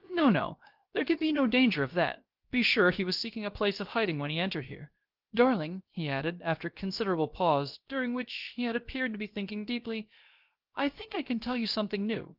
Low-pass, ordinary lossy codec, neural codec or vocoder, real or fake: 5.4 kHz; Opus, 32 kbps; codec, 16 kHz, about 1 kbps, DyCAST, with the encoder's durations; fake